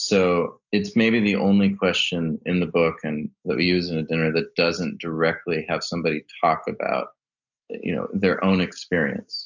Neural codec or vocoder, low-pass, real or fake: none; 7.2 kHz; real